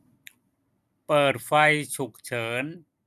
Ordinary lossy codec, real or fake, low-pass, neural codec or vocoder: none; fake; 14.4 kHz; vocoder, 44.1 kHz, 128 mel bands every 256 samples, BigVGAN v2